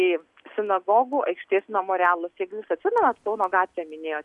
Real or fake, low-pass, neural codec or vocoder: real; 10.8 kHz; none